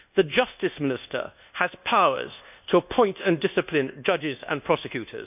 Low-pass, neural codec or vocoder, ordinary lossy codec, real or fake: 3.6 kHz; codec, 24 kHz, 1.2 kbps, DualCodec; none; fake